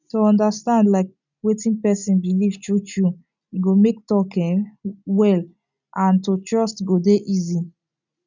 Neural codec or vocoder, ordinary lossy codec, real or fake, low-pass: none; none; real; 7.2 kHz